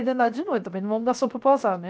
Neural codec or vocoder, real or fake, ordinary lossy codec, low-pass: codec, 16 kHz, 0.7 kbps, FocalCodec; fake; none; none